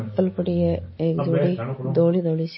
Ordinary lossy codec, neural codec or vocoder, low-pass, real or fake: MP3, 24 kbps; none; 7.2 kHz; real